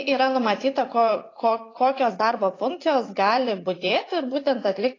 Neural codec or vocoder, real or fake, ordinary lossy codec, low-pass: vocoder, 44.1 kHz, 80 mel bands, Vocos; fake; AAC, 32 kbps; 7.2 kHz